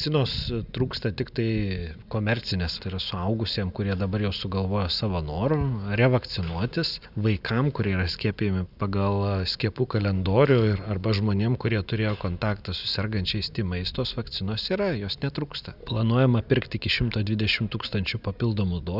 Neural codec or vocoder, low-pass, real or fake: none; 5.4 kHz; real